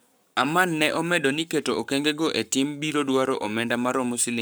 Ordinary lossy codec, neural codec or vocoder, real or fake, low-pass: none; codec, 44.1 kHz, 7.8 kbps, Pupu-Codec; fake; none